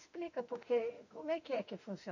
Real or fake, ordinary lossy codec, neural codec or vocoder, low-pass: fake; none; autoencoder, 48 kHz, 32 numbers a frame, DAC-VAE, trained on Japanese speech; 7.2 kHz